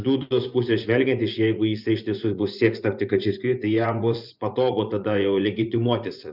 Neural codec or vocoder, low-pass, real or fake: vocoder, 24 kHz, 100 mel bands, Vocos; 5.4 kHz; fake